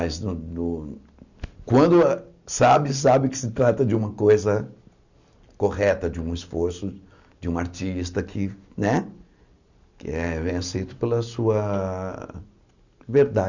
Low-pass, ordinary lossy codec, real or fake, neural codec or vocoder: 7.2 kHz; none; real; none